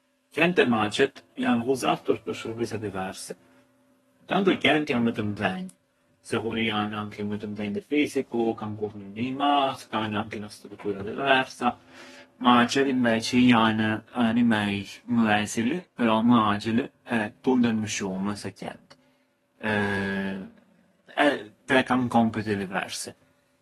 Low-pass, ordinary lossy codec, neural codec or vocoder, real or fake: 14.4 kHz; AAC, 32 kbps; codec, 32 kHz, 1.9 kbps, SNAC; fake